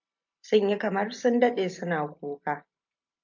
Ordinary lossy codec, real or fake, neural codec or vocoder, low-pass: MP3, 48 kbps; real; none; 7.2 kHz